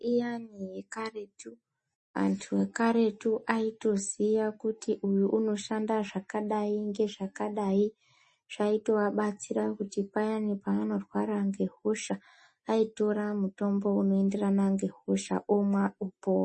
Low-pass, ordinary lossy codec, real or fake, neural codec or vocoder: 10.8 kHz; MP3, 32 kbps; real; none